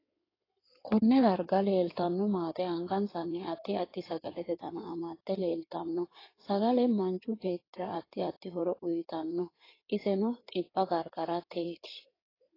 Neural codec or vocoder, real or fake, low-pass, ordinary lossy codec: codec, 16 kHz in and 24 kHz out, 2.2 kbps, FireRedTTS-2 codec; fake; 5.4 kHz; AAC, 24 kbps